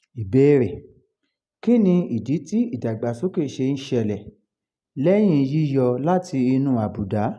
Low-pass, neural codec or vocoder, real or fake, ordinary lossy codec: none; none; real; none